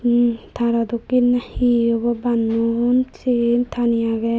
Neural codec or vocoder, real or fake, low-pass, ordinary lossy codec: none; real; none; none